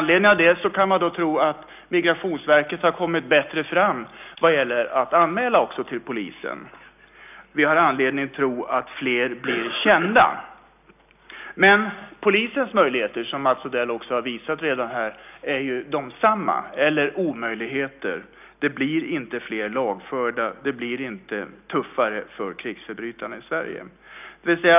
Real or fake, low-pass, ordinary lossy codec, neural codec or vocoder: real; 3.6 kHz; none; none